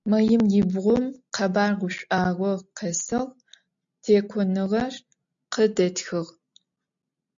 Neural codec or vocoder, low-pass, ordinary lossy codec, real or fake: none; 7.2 kHz; AAC, 64 kbps; real